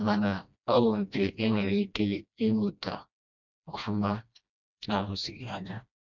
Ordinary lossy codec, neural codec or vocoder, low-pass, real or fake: none; codec, 16 kHz, 1 kbps, FreqCodec, smaller model; 7.2 kHz; fake